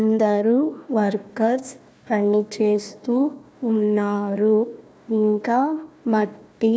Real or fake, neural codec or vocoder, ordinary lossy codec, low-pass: fake; codec, 16 kHz, 1 kbps, FunCodec, trained on Chinese and English, 50 frames a second; none; none